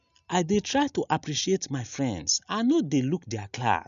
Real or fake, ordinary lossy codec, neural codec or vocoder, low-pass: real; none; none; 7.2 kHz